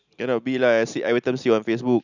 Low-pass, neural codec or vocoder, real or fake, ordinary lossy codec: 7.2 kHz; none; real; none